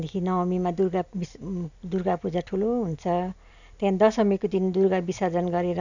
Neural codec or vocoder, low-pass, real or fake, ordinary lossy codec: none; 7.2 kHz; real; none